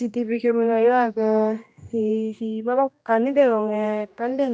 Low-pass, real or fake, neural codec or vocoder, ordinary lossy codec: none; fake; codec, 16 kHz, 1 kbps, X-Codec, HuBERT features, trained on general audio; none